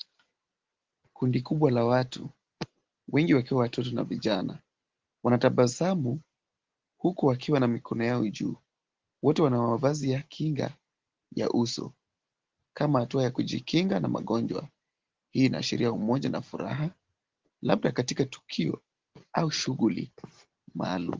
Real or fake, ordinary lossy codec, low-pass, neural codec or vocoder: real; Opus, 16 kbps; 7.2 kHz; none